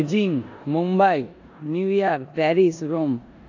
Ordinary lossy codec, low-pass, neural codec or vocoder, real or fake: AAC, 48 kbps; 7.2 kHz; codec, 16 kHz in and 24 kHz out, 0.9 kbps, LongCat-Audio-Codec, four codebook decoder; fake